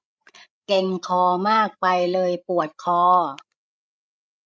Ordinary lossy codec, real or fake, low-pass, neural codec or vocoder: none; fake; none; codec, 16 kHz, 16 kbps, FreqCodec, larger model